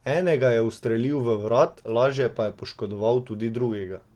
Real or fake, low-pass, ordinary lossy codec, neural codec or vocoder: real; 19.8 kHz; Opus, 16 kbps; none